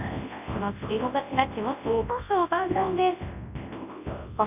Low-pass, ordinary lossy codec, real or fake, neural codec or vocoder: 3.6 kHz; none; fake; codec, 24 kHz, 0.9 kbps, WavTokenizer, large speech release